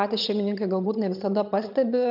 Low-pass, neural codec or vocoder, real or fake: 5.4 kHz; vocoder, 22.05 kHz, 80 mel bands, HiFi-GAN; fake